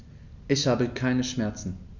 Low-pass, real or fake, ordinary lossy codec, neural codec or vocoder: 7.2 kHz; real; none; none